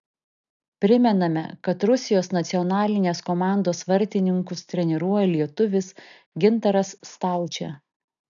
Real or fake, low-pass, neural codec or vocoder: real; 7.2 kHz; none